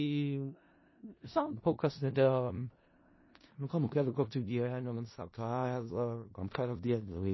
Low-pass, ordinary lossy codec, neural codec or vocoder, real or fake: 7.2 kHz; MP3, 24 kbps; codec, 16 kHz in and 24 kHz out, 0.4 kbps, LongCat-Audio-Codec, four codebook decoder; fake